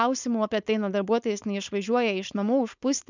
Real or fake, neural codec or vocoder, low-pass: fake; codec, 16 kHz, 4.8 kbps, FACodec; 7.2 kHz